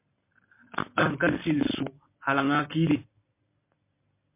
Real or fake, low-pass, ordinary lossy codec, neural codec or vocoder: real; 3.6 kHz; MP3, 24 kbps; none